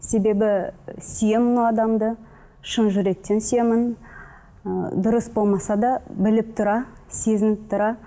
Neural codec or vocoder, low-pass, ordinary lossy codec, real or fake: none; none; none; real